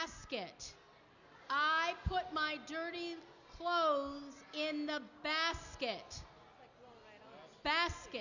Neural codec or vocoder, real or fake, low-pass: none; real; 7.2 kHz